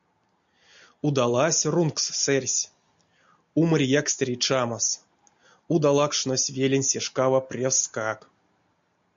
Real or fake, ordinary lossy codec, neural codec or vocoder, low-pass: real; MP3, 64 kbps; none; 7.2 kHz